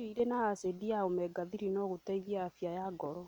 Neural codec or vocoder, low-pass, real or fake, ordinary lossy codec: none; 19.8 kHz; real; none